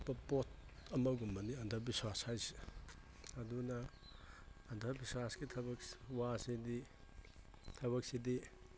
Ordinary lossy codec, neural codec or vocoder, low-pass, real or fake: none; none; none; real